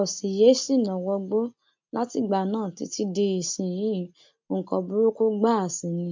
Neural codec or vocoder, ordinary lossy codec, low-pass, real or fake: none; MP3, 64 kbps; 7.2 kHz; real